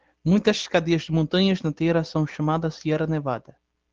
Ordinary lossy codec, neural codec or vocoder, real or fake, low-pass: Opus, 16 kbps; none; real; 7.2 kHz